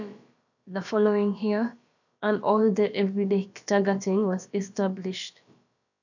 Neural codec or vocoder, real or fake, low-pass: codec, 16 kHz, about 1 kbps, DyCAST, with the encoder's durations; fake; 7.2 kHz